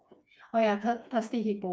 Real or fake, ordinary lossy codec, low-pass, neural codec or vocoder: fake; none; none; codec, 16 kHz, 2 kbps, FreqCodec, smaller model